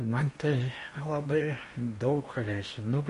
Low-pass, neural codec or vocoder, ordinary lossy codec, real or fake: 10.8 kHz; codec, 16 kHz in and 24 kHz out, 0.8 kbps, FocalCodec, streaming, 65536 codes; MP3, 48 kbps; fake